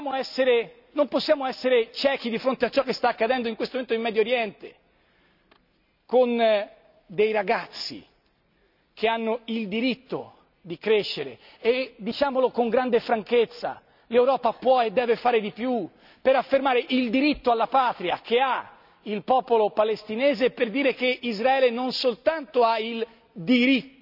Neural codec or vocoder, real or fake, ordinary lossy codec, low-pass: none; real; none; 5.4 kHz